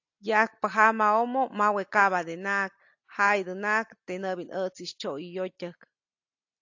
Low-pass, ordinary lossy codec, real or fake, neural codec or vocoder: 7.2 kHz; AAC, 48 kbps; real; none